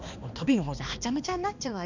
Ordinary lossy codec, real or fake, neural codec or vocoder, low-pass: none; fake; codec, 16 kHz, 2 kbps, X-Codec, HuBERT features, trained on balanced general audio; 7.2 kHz